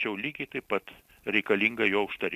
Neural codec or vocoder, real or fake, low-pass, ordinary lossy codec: none; real; 14.4 kHz; MP3, 96 kbps